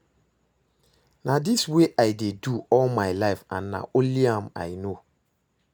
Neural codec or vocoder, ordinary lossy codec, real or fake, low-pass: none; none; real; none